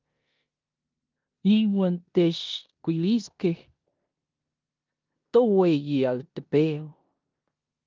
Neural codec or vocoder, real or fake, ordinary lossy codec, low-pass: codec, 16 kHz in and 24 kHz out, 0.9 kbps, LongCat-Audio-Codec, four codebook decoder; fake; Opus, 24 kbps; 7.2 kHz